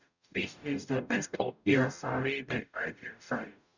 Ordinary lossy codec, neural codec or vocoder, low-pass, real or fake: none; codec, 44.1 kHz, 0.9 kbps, DAC; 7.2 kHz; fake